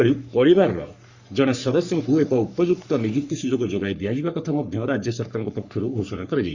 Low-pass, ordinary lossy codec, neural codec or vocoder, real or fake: 7.2 kHz; Opus, 64 kbps; codec, 44.1 kHz, 3.4 kbps, Pupu-Codec; fake